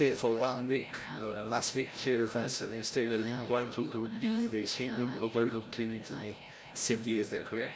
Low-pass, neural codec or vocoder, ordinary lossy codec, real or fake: none; codec, 16 kHz, 0.5 kbps, FreqCodec, larger model; none; fake